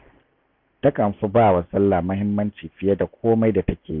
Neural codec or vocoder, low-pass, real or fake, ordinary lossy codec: none; 5.4 kHz; real; none